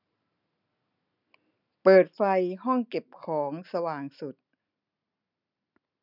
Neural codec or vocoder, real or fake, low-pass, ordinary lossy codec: none; real; 5.4 kHz; none